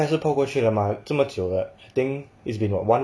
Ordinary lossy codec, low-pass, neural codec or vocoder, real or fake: none; none; none; real